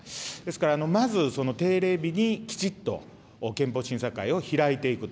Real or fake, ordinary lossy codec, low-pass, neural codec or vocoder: real; none; none; none